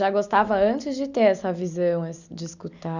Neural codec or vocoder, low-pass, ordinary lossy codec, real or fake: none; 7.2 kHz; none; real